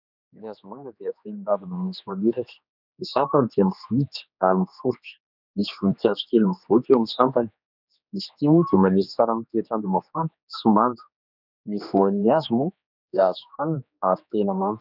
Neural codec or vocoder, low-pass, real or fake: codec, 16 kHz, 2 kbps, X-Codec, HuBERT features, trained on general audio; 5.4 kHz; fake